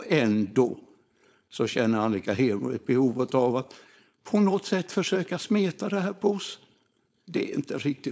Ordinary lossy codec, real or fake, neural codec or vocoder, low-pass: none; fake; codec, 16 kHz, 4.8 kbps, FACodec; none